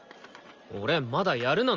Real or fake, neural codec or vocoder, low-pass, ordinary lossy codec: real; none; 7.2 kHz; Opus, 32 kbps